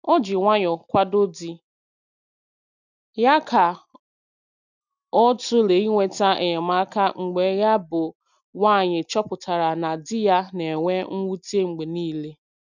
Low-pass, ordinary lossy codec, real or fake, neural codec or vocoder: 7.2 kHz; none; real; none